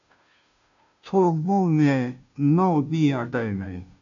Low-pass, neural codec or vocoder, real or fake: 7.2 kHz; codec, 16 kHz, 0.5 kbps, FunCodec, trained on Chinese and English, 25 frames a second; fake